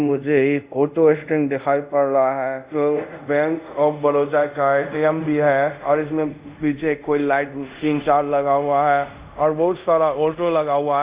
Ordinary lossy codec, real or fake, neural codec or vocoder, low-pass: Opus, 64 kbps; fake; codec, 24 kHz, 0.5 kbps, DualCodec; 3.6 kHz